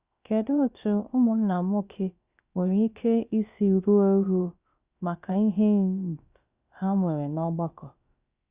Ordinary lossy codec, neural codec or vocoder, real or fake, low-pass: Opus, 64 kbps; codec, 16 kHz, about 1 kbps, DyCAST, with the encoder's durations; fake; 3.6 kHz